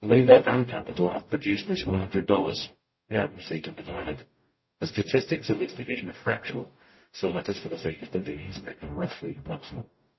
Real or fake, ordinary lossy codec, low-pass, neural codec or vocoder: fake; MP3, 24 kbps; 7.2 kHz; codec, 44.1 kHz, 0.9 kbps, DAC